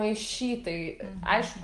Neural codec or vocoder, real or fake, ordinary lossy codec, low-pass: none; real; Opus, 24 kbps; 10.8 kHz